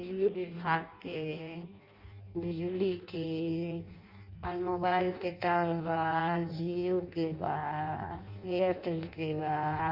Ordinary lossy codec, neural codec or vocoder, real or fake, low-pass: Opus, 64 kbps; codec, 16 kHz in and 24 kHz out, 0.6 kbps, FireRedTTS-2 codec; fake; 5.4 kHz